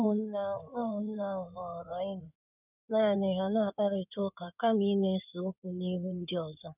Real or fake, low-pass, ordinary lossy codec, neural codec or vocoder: fake; 3.6 kHz; none; codec, 16 kHz in and 24 kHz out, 2.2 kbps, FireRedTTS-2 codec